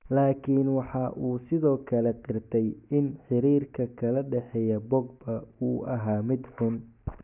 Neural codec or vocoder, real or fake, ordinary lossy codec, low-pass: none; real; none; 3.6 kHz